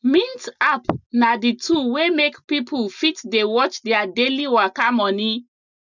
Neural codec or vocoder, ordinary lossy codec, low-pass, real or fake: none; none; 7.2 kHz; real